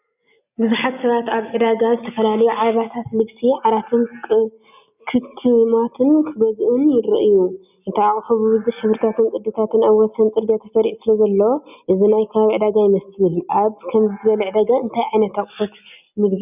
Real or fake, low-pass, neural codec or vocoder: real; 3.6 kHz; none